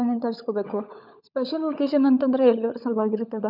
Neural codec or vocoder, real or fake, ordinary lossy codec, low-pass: codec, 16 kHz, 16 kbps, FunCodec, trained on LibriTTS, 50 frames a second; fake; none; 5.4 kHz